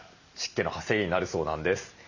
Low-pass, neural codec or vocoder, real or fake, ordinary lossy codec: 7.2 kHz; none; real; none